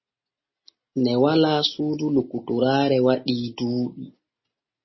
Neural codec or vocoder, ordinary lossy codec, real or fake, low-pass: none; MP3, 24 kbps; real; 7.2 kHz